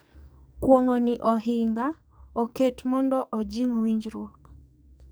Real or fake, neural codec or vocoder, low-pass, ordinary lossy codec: fake; codec, 44.1 kHz, 2.6 kbps, SNAC; none; none